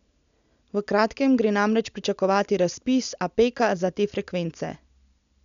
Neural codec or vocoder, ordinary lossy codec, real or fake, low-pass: none; none; real; 7.2 kHz